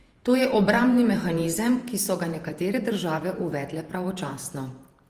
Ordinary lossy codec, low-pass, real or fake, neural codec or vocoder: Opus, 24 kbps; 14.4 kHz; fake; vocoder, 48 kHz, 128 mel bands, Vocos